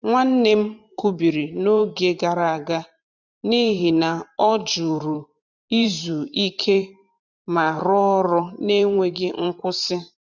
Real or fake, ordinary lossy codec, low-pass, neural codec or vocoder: fake; none; 7.2 kHz; vocoder, 44.1 kHz, 128 mel bands every 512 samples, BigVGAN v2